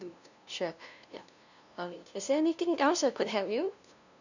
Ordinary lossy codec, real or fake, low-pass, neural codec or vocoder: none; fake; 7.2 kHz; codec, 16 kHz, 0.5 kbps, FunCodec, trained on LibriTTS, 25 frames a second